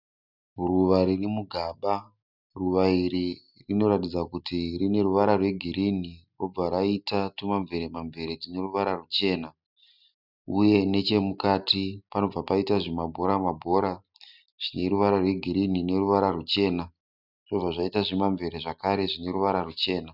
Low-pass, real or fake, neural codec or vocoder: 5.4 kHz; real; none